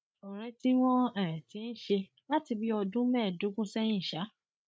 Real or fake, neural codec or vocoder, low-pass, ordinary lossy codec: fake; codec, 16 kHz, 8 kbps, FreqCodec, larger model; none; none